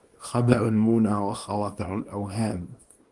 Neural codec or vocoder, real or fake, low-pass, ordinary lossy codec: codec, 24 kHz, 0.9 kbps, WavTokenizer, small release; fake; 10.8 kHz; Opus, 32 kbps